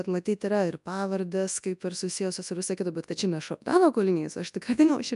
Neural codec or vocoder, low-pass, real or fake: codec, 24 kHz, 0.9 kbps, WavTokenizer, large speech release; 10.8 kHz; fake